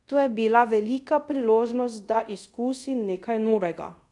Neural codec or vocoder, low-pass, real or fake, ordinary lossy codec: codec, 24 kHz, 0.5 kbps, DualCodec; 10.8 kHz; fake; none